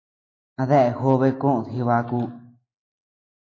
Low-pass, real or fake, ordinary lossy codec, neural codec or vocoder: 7.2 kHz; real; AAC, 32 kbps; none